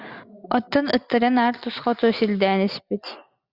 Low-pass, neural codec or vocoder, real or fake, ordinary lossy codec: 5.4 kHz; none; real; Opus, 64 kbps